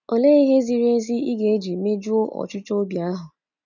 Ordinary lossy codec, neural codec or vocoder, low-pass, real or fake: none; none; 7.2 kHz; real